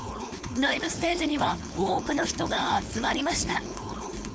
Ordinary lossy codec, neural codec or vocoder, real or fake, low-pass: none; codec, 16 kHz, 4.8 kbps, FACodec; fake; none